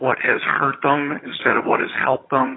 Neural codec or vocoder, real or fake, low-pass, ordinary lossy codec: vocoder, 22.05 kHz, 80 mel bands, HiFi-GAN; fake; 7.2 kHz; AAC, 16 kbps